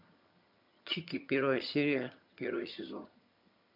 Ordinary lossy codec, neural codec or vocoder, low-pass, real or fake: MP3, 48 kbps; vocoder, 22.05 kHz, 80 mel bands, HiFi-GAN; 5.4 kHz; fake